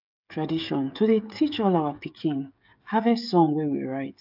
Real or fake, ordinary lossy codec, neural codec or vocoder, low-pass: fake; AAC, 48 kbps; codec, 16 kHz, 16 kbps, FreqCodec, smaller model; 5.4 kHz